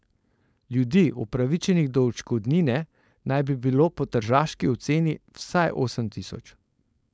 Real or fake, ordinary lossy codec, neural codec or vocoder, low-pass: fake; none; codec, 16 kHz, 4.8 kbps, FACodec; none